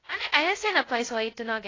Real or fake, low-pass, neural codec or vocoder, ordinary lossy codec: fake; 7.2 kHz; codec, 16 kHz, 0.2 kbps, FocalCodec; AAC, 32 kbps